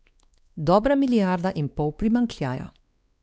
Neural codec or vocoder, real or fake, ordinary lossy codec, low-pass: codec, 16 kHz, 2 kbps, X-Codec, WavLM features, trained on Multilingual LibriSpeech; fake; none; none